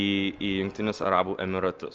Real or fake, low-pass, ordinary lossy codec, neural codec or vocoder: real; 7.2 kHz; Opus, 24 kbps; none